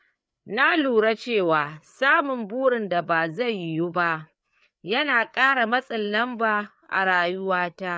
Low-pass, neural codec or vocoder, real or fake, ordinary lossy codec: none; codec, 16 kHz, 4 kbps, FreqCodec, larger model; fake; none